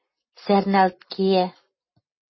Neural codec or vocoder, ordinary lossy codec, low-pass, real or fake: none; MP3, 24 kbps; 7.2 kHz; real